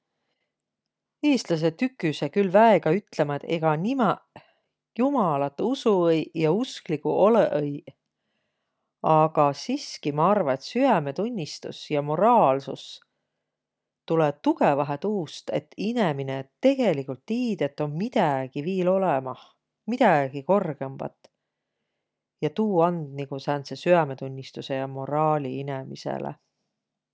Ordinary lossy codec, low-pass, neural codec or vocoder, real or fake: none; none; none; real